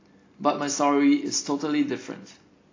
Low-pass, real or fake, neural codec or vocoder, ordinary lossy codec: 7.2 kHz; real; none; AAC, 32 kbps